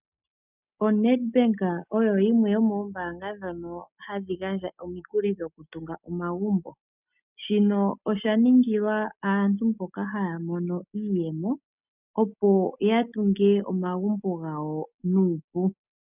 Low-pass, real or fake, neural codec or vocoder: 3.6 kHz; real; none